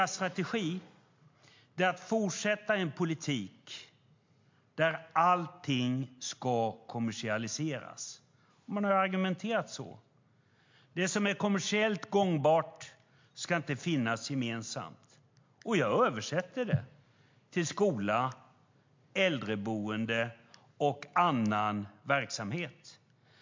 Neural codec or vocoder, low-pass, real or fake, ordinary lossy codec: none; 7.2 kHz; real; MP3, 48 kbps